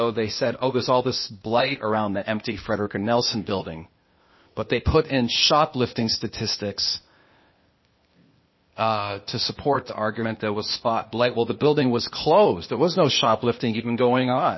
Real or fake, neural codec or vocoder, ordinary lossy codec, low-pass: fake; codec, 16 kHz, 0.8 kbps, ZipCodec; MP3, 24 kbps; 7.2 kHz